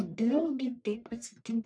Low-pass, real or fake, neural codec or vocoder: 9.9 kHz; fake; codec, 44.1 kHz, 1.7 kbps, Pupu-Codec